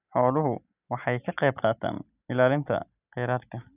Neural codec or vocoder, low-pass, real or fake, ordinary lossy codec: none; 3.6 kHz; real; none